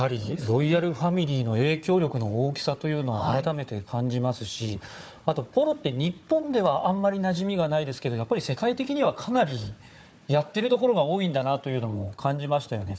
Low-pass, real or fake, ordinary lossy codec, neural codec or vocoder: none; fake; none; codec, 16 kHz, 4 kbps, FunCodec, trained on Chinese and English, 50 frames a second